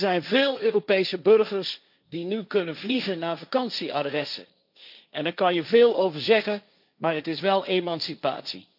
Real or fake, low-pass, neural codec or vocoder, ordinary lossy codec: fake; 5.4 kHz; codec, 16 kHz, 1.1 kbps, Voila-Tokenizer; none